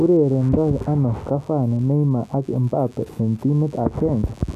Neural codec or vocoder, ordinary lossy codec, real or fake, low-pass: none; none; real; 14.4 kHz